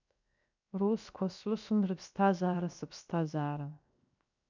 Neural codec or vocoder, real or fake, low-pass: codec, 16 kHz, 0.7 kbps, FocalCodec; fake; 7.2 kHz